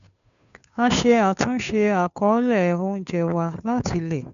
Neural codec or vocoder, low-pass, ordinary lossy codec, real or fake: codec, 16 kHz, 2 kbps, FunCodec, trained on Chinese and English, 25 frames a second; 7.2 kHz; none; fake